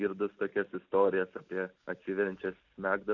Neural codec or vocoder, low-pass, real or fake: none; 7.2 kHz; real